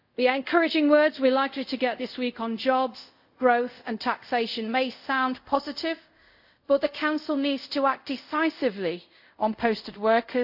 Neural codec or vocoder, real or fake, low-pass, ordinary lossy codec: codec, 24 kHz, 0.5 kbps, DualCodec; fake; 5.4 kHz; AAC, 48 kbps